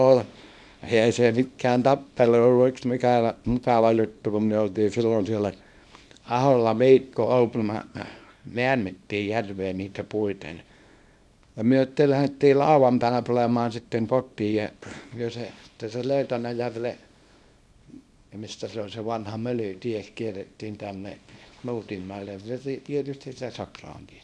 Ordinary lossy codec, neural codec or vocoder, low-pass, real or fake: none; codec, 24 kHz, 0.9 kbps, WavTokenizer, small release; none; fake